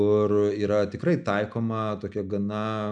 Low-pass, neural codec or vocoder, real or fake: 9.9 kHz; none; real